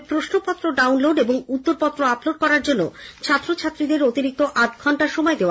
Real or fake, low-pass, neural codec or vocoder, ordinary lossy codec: real; none; none; none